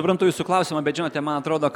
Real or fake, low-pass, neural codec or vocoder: real; 19.8 kHz; none